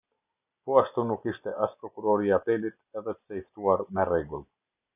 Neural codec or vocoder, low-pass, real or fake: none; 3.6 kHz; real